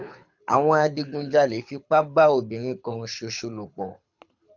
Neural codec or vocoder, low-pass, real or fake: codec, 24 kHz, 6 kbps, HILCodec; 7.2 kHz; fake